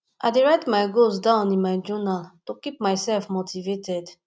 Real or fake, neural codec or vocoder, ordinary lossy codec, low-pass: real; none; none; none